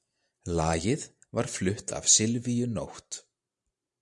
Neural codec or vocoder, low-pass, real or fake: vocoder, 44.1 kHz, 128 mel bands every 512 samples, BigVGAN v2; 10.8 kHz; fake